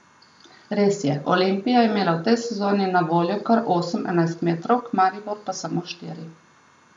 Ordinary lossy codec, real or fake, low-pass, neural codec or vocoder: none; real; 10.8 kHz; none